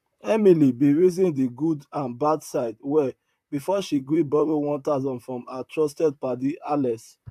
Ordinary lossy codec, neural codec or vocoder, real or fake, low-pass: AAC, 96 kbps; vocoder, 44.1 kHz, 128 mel bands, Pupu-Vocoder; fake; 14.4 kHz